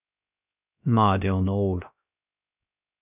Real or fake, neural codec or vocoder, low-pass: fake; codec, 16 kHz, 0.3 kbps, FocalCodec; 3.6 kHz